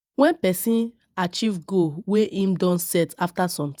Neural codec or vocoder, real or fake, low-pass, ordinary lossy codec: vocoder, 48 kHz, 128 mel bands, Vocos; fake; none; none